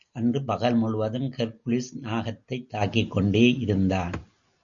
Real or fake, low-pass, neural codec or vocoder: real; 7.2 kHz; none